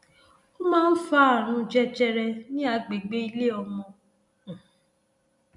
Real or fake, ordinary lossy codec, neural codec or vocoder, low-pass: real; none; none; 10.8 kHz